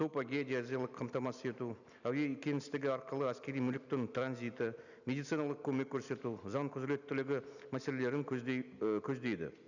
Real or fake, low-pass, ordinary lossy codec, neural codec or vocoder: real; 7.2 kHz; none; none